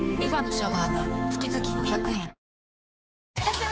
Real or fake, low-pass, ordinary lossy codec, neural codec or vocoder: fake; none; none; codec, 16 kHz, 4 kbps, X-Codec, HuBERT features, trained on general audio